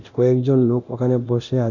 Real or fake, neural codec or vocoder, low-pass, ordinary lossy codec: fake; codec, 24 kHz, 0.9 kbps, DualCodec; 7.2 kHz; none